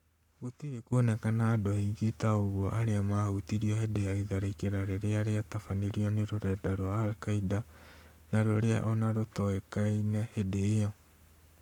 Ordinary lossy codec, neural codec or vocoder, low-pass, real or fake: none; codec, 44.1 kHz, 7.8 kbps, Pupu-Codec; 19.8 kHz; fake